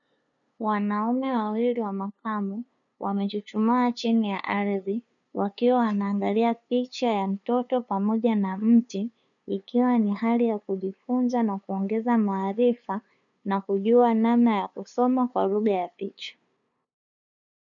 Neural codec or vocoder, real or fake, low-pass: codec, 16 kHz, 2 kbps, FunCodec, trained on LibriTTS, 25 frames a second; fake; 7.2 kHz